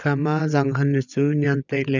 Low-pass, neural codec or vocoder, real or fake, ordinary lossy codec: 7.2 kHz; vocoder, 22.05 kHz, 80 mel bands, WaveNeXt; fake; none